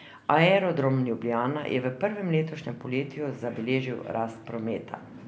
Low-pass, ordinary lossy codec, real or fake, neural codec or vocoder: none; none; real; none